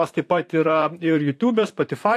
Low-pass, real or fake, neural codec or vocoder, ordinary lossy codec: 14.4 kHz; fake; vocoder, 44.1 kHz, 128 mel bands, Pupu-Vocoder; AAC, 64 kbps